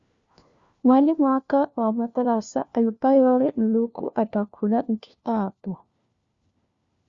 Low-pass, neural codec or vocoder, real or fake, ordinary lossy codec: 7.2 kHz; codec, 16 kHz, 1 kbps, FunCodec, trained on LibriTTS, 50 frames a second; fake; Opus, 64 kbps